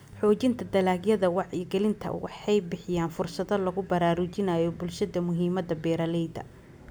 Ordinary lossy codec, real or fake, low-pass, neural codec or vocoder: none; real; none; none